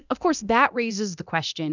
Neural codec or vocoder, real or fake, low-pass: codec, 24 kHz, 0.9 kbps, DualCodec; fake; 7.2 kHz